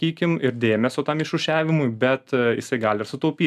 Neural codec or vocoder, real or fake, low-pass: none; real; 14.4 kHz